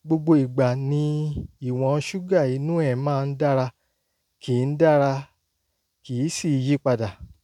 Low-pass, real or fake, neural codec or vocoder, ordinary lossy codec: 19.8 kHz; real; none; none